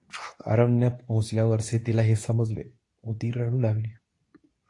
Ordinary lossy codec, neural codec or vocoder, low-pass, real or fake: AAC, 48 kbps; codec, 24 kHz, 0.9 kbps, WavTokenizer, medium speech release version 2; 10.8 kHz; fake